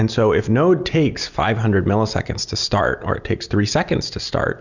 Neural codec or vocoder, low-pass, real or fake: none; 7.2 kHz; real